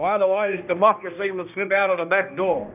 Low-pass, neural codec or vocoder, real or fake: 3.6 kHz; codec, 16 kHz, 1 kbps, X-Codec, HuBERT features, trained on balanced general audio; fake